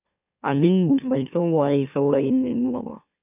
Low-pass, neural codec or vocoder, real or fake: 3.6 kHz; autoencoder, 44.1 kHz, a latent of 192 numbers a frame, MeloTTS; fake